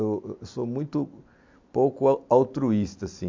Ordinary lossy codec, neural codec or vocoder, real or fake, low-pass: MP3, 48 kbps; none; real; 7.2 kHz